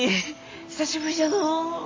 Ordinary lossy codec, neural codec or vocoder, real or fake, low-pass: AAC, 32 kbps; none; real; 7.2 kHz